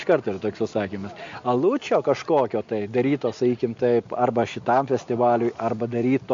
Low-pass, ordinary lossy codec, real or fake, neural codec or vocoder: 7.2 kHz; MP3, 48 kbps; real; none